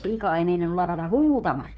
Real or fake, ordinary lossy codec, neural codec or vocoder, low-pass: fake; none; codec, 16 kHz, 2 kbps, FunCodec, trained on Chinese and English, 25 frames a second; none